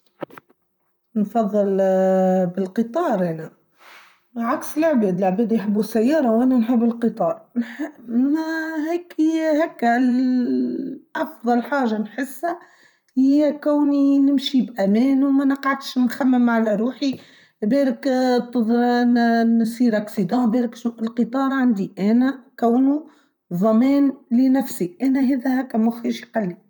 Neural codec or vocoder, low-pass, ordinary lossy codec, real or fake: vocoder, 44.1 kHz, 128 mel bands, Pupu-Vocoder; 19.8 kHz; none; fake